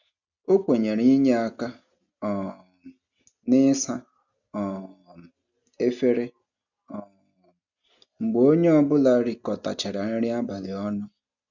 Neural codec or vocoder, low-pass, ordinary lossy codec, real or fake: none; 7.2 kHz; none; real